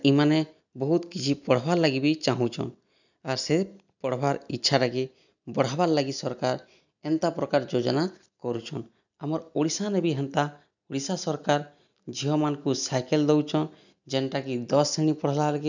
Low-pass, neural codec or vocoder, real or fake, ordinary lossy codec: 7.2 kHz; none; real; none